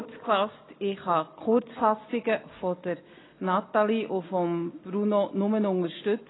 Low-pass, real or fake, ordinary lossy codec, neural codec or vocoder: 7.2 kHz; real; AAC, 16 kbps; none